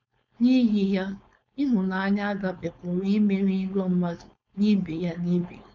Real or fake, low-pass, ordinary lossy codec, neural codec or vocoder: fake; 7.2 kHz; none; codec, 16 kHz, 4.8 kbps, FACodec